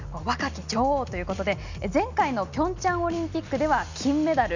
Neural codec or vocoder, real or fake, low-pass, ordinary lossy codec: none; real; 7.2 kHz; none